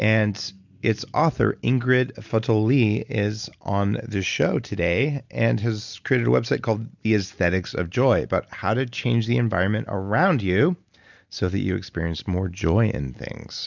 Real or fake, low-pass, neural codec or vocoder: real; 7.2 kHz; none